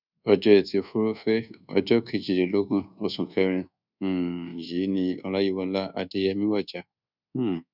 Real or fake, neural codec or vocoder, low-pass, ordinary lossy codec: fake; codec, 24 kHz, 1.2 kbps, DualCodec; 5.4 kHz; none